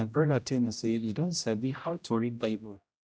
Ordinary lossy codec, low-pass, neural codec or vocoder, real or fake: none; none; codec, 16 kHz, 0.5 kbps, X-Codec, HuBERT features, trained on general audio; fake